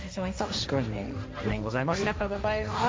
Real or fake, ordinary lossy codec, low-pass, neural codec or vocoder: fake; none; none; codec, 16 kHz, 1.1 kbps, Voila-Tokenizer